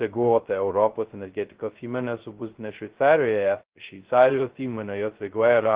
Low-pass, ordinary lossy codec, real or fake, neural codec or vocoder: 3.6 kHz; Opus, 16 kbps; fake; codec, 16 kHz, 0.2 kbps, FocalCodec